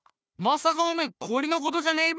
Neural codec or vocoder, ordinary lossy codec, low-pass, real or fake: codec, 16 kHz, 1 kbps, FunCodec, trained on Chinese and English, 50 frames a second; none; none; fake